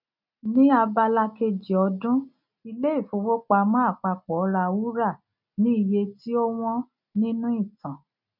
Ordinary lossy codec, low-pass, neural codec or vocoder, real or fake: none; 5.4 kHz; none; real